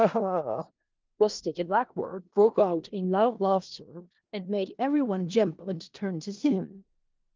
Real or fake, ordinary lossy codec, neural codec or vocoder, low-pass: fake; Opus, 32 kbps; codec, 16 kHz in and 24 kHz out, 0.4 kbps, LongCat-Audio-Codec, four codebook decoder; 7.2 kHz